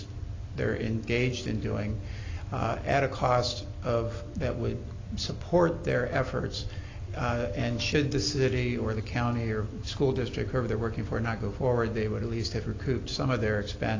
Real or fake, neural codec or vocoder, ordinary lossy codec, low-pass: real; none; AAC, 32 kbps; 7.2 kHz